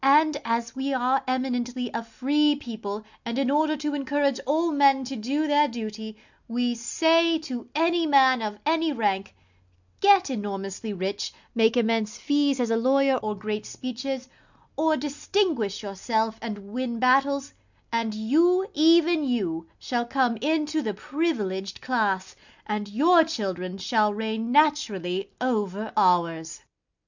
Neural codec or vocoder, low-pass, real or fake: none; 7.2 kHz; real